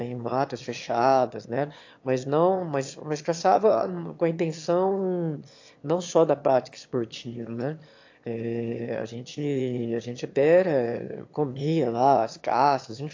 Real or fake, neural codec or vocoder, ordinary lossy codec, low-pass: fake; autoencoder, 22.05 kHz, a latent of 192 numbers a frame, VITS, trained on one speaker; AAC, 48 kbps; 7.2 kHz